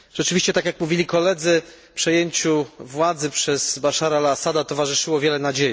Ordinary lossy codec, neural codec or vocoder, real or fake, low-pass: none; none; real; none